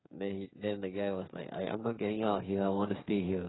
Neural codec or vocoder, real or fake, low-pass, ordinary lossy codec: codec, 16 kHz, 8 kbps, FreqCodec, smaller model; fake; 7.2 kHz; AAC, 16 kbps